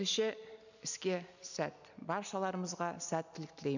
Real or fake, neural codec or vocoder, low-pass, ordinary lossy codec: real; none; 7.2 kHz; none